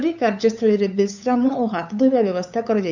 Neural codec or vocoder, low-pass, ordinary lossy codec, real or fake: codec, 16 kHz, 8 kbps, FunCodec, trained on LibriTTS, 25 frames a second; 7.2 kHz; none; fake